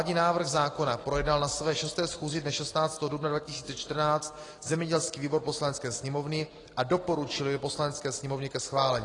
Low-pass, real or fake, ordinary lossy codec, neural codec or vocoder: 10.8 kHz; real; AAC, 32 kbps; none